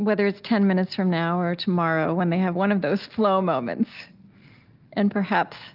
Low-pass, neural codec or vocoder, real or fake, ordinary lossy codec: 5.4 kHz; none; real; Opus, 32 kbps